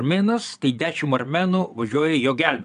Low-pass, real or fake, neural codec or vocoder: 9.9 kHz; fake; vocoder, 22.05 kHz, 80 mel bands, WaveNeXt